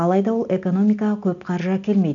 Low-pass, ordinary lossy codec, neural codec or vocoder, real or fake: 7.2 kHz; none; none; real